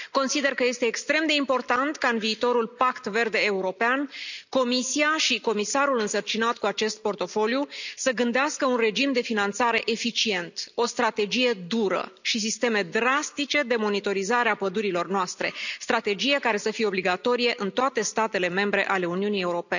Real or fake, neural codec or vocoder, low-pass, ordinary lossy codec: real; none; 7.2 kHz; none